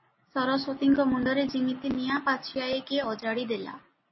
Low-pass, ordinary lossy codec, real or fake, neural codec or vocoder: 7.2 kHz; MP3, 24 kbps; real; none